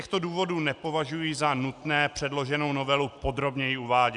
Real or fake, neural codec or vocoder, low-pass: real; none; 10.8 kHz